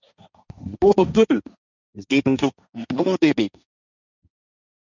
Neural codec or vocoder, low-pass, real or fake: codec, 16 kHz, 1.1 kbps, Voila-Tokenizer; 7.2 kHz; fake